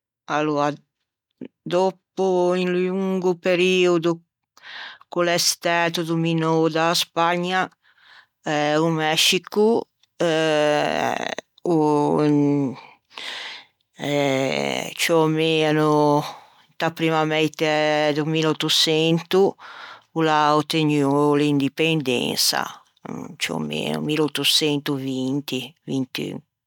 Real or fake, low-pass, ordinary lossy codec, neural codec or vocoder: real; 19.8 kHz; none; none